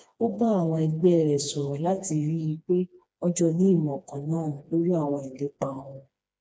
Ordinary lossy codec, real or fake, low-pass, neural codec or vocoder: none; fake; none; codec, 16 kHz, 2 kbps, FreqCodec, smaller model